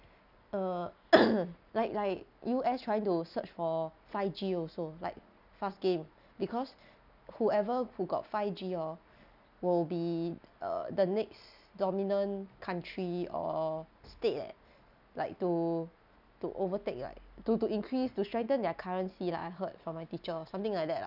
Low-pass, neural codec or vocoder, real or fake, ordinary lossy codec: 5.4 kHz; none; real; none